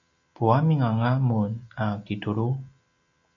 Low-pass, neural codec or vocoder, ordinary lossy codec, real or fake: 7.2 kHz; none; AAC, 64 kbps; real